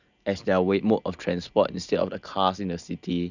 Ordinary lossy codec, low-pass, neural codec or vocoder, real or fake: none; 7.2 kHz; none; real